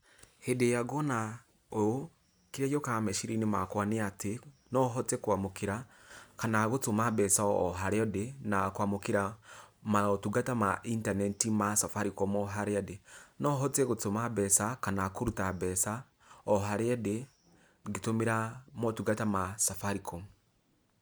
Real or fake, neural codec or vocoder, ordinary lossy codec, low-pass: real; none; none; none